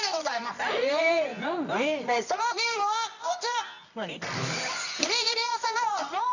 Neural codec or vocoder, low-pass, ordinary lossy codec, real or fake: codec, 24 kHz, 0.9 kbps, WavTokenizer, medium music audio release; 7.2 kHz; none; fake